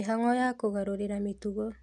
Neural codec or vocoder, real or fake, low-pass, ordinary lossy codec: none; real; none; none